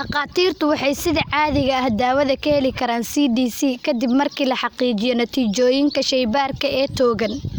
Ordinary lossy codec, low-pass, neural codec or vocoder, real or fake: none; none; none; real